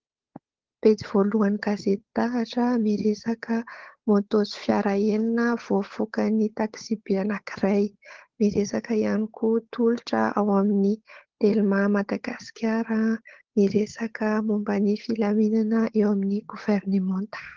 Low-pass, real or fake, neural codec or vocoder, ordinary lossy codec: 7.2 kHz; fake; codec, 16 kHz, 8 kbps, FunCodec, trained on Chinese and English, 25 frames a second; Opus, 16 kbps